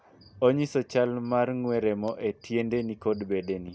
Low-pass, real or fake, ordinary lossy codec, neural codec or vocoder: none; real; none; none